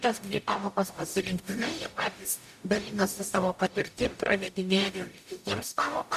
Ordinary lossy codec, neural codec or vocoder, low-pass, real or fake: MP3, 96 kbps; codec, 44.1 kHz, 0.9 kbps, DAC; 14.4 kHz; fake